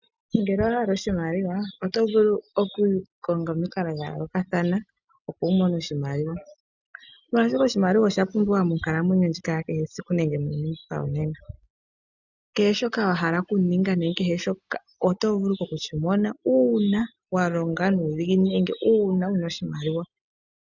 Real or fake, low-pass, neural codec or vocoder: real; 7.2 kHz; none